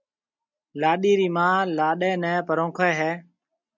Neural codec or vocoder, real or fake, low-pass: none; real; 7.2 kHz